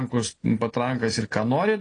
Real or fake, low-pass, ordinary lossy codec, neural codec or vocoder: real; 9.9 kHz; AAC, 32 kbps; none